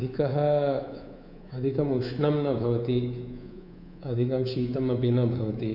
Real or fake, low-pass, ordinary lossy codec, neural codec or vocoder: fake; 5.4 kHz; none; codec, 24 kHz, 3.1 kbps, DualCodec